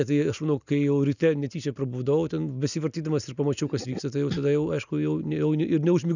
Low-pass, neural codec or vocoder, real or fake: 7.2 kHz; none; real